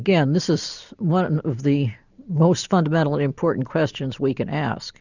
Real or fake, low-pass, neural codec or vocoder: real; 7.2 kHz; none